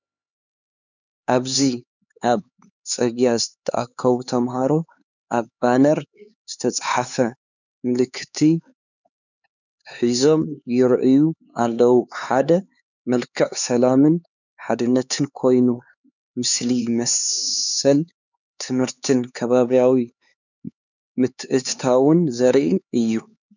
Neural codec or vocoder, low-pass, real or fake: codec, 16 kHz, 4 kbps, X-Codec, HuBERT features, trained on LibriSpeech; 7.2 kHz; fake